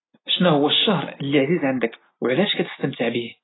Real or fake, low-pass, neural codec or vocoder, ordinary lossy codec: real; 7.2 kHz; none; AAC, 16 kbps